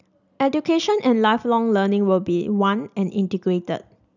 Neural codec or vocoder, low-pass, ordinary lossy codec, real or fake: none; 7.2 kHz; none; real